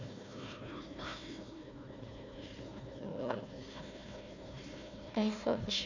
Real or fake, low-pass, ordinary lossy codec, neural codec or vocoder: fake; 7.2 kHz; none; codec, 16 kHz, 1 kbps, FunCodec, trained on Chinese and English, 50 frames a second